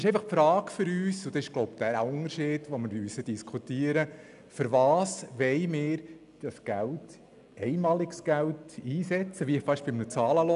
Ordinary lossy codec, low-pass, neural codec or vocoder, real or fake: none; 10.8 kHz; none; real